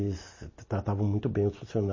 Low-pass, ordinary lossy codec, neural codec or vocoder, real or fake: 7.2 kHz; MP3, 32 kbps; none; real